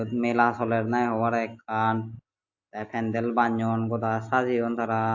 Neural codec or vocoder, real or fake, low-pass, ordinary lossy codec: none; real; 7.2 kHz; AAC, 48 kbps